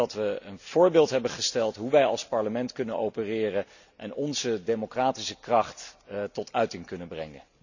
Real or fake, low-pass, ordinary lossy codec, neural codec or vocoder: real; 7.2 kHz; none; none